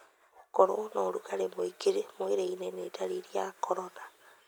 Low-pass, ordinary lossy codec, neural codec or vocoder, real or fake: none; none; none; real